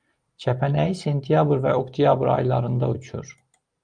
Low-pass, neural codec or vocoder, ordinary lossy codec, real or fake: 9.9 kHz; none; Opus, 32 kbps; real